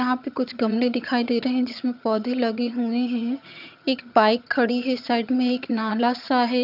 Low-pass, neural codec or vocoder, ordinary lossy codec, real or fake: 5.4 kHz; vocoder, 22.05 kHz, 80 mel bands, HiFi-GAN; none; fake